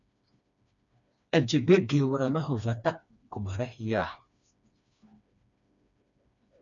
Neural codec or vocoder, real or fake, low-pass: codec, 16 kHz, 2 kbps, FreqCodec, smaller model; fake; 7.2 kHz